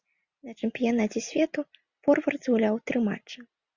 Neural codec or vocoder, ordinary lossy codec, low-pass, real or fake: none; Opus, 64 kbps; 7.2 kHz; real